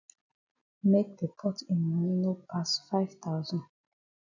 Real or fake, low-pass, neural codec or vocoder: real; 7.2 kHz; none